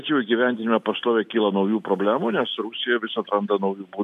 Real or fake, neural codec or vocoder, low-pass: real; none; 14.4 kHz